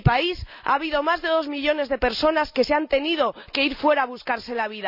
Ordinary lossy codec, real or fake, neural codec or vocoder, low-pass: none; real; none; 5.4 kHz